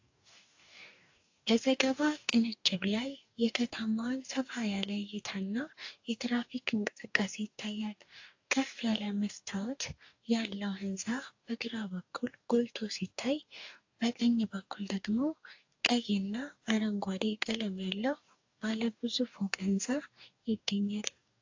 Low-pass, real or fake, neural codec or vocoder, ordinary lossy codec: 7.2 kHz; fake; codec, 44.1 kHz, 2.6 kbps, DAC; AAC, 48 kbps